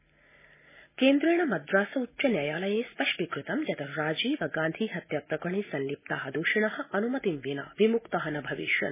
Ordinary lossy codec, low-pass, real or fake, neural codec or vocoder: MP3, 16 kbps; 3.6 kHz; real; none